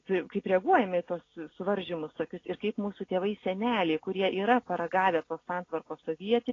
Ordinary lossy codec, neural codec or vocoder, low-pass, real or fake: AAC, 32 kbps; none; 7.2 kHz; real